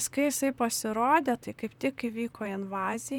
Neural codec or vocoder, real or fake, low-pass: vocoder, 44.1 kHz, 128 mel bands, Pupu-Vocoder; fake; 19.8 kHz